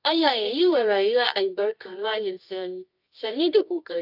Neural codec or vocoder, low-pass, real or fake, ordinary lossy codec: codec, 24 kHz, 0.9 kbps, WavTokenizer, medium music audio release; 5.4 kHz; fake; AAC, 48 kbps